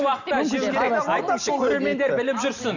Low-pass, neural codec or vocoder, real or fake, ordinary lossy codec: 7.2 kHz; none; real; none